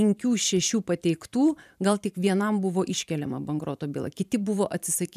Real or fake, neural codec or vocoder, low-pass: fake; vocoder, 44.1 kHz, 128 mel bands every 512 samples, BigVGAN v2; 14.4 kHz